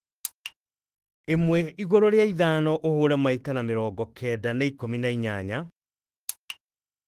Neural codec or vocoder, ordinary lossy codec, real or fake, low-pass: autoencoder, 48 kHz, 32 numbers a frame, DAC-VAE, trained on Japanese speech; Opus, 24 kbps; fake; 14.4 kHz